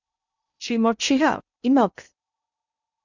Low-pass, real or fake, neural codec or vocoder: 7.2 kHz; fake; codec, 16 kHz in and 24 kHz out, 0.6 kbps, FocalCodec, streaming, 2048 codes